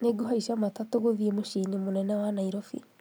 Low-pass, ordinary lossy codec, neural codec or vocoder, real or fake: none; none; none; real